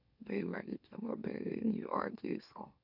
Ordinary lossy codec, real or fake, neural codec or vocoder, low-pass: none; fake; autoencoder, 44.1 kHz, a latent of 192 numbers a frame, MeloTTS; 5.4 kHz